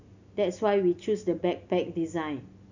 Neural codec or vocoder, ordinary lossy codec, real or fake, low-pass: none; none; real; 7.2 kHz